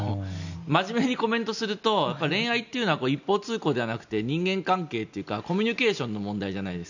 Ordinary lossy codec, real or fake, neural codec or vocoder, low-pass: none; real; none; 7.2 kHz